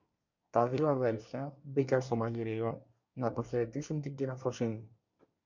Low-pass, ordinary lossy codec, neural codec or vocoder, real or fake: 7.2 kHz; MP3, 64 kbps; codec, 24 kHz, 1 kbps, SNAC; fake